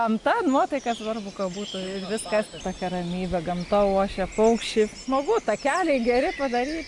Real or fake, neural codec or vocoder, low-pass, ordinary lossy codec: real; none; 10.8 kHz; MP3, 64 kbps